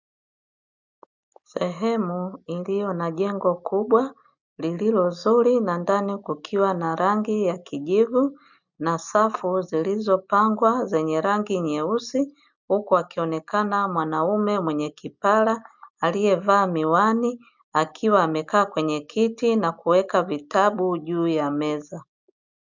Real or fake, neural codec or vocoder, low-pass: real; none; 7.2 kHz